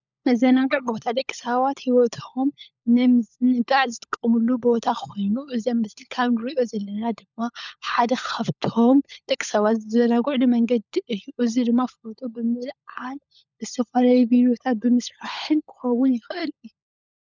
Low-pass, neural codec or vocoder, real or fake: 7.2 kHz; codec, 16 kHz, 16 kbps, FunCodec, trained on LibriTTS, 50 frames a second; fake